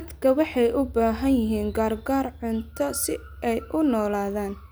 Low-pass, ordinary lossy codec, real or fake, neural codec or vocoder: none; none; real; none